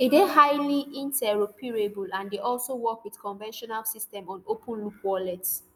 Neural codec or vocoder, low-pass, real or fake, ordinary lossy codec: none; none; real; none